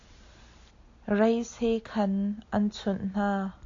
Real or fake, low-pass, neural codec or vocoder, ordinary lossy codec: real; 7.2 kHz; none; AAC, 48 kbps